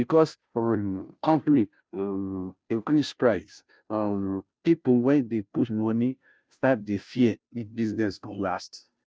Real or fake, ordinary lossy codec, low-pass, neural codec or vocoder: fake; none; none; codec, 16 kHz, 0.5 kbps, FunCodec, trained on Chinese and English, 25 frames a second